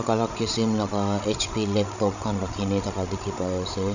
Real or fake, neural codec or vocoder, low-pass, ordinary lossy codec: fake; codec, 16 kHz, 16 kbps, FreqCodec, larger model; 7.2 kHz; none